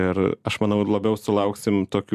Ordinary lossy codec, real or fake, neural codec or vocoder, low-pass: MP3, 96 kbps; real; none; 14.4 kHz